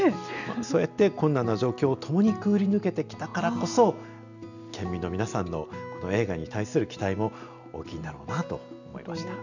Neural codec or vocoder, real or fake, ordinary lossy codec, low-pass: none; real; none; 7.2 kHz